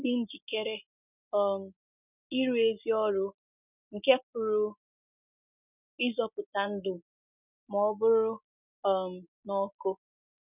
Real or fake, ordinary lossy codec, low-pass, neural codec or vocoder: real; none; 3.6 kHz; none